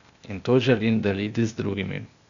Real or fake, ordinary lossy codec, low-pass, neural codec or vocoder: fake; none; 7.2 kHz; codec, 16 kHz, 0.8 kbps, ZipCodec